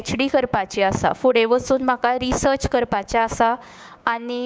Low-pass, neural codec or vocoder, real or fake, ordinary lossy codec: none; codec, 16 kHz, 6 kbps, DAC; fake; none